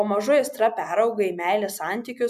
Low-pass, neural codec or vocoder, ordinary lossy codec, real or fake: 14.4 kHz; none; MP3, 96 kbps; real